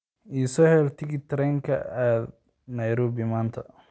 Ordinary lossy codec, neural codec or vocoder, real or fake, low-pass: none; none; real; none